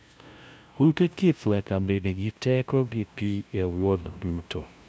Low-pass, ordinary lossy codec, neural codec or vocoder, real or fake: none; none; codec, 16 kHz, 0.5 kbps, FunCodec, trained on LibriTTS, 25 frames a second; fake